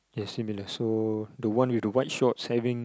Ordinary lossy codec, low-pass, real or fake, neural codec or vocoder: none; none; real; none